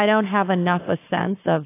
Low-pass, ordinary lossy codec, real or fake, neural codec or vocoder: 3.6 kHz; AAC, 24 kbps; fake; codec, 44.1 kHz, 7.8 kbps, Pupu-Codec